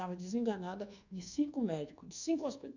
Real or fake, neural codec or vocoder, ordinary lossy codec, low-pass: fake; codec, 24 kHz, 1.2 kbps, DualCodec; none; 7.2 kHz